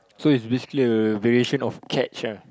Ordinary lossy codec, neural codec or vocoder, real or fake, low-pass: none; none; real; none